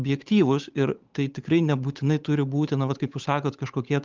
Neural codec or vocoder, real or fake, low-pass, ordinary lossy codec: codec, 16 kHz, 8 kbps, FunCodec, trained on Chinese and English, 25 frames a second; fake; 7.2 kHz; Opus, 32 kbps